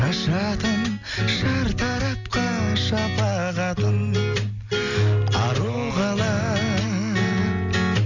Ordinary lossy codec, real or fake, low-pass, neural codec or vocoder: none; real; 7.2 kHz; none